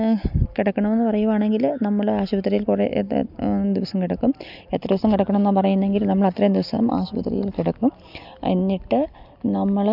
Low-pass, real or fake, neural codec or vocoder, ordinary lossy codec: 5.4 kHz; real; none; none